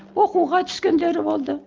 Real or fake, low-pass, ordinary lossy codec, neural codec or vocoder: real; 7.2 kHz; Opus, 24 kbps; none